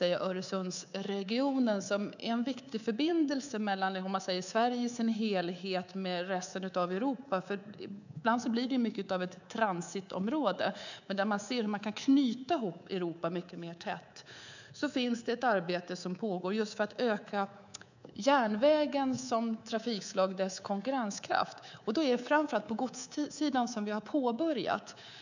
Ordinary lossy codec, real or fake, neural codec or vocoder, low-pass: none; fake; codec, 24 kHz, 3.1 kbps, DualCodec; 7.2 kHz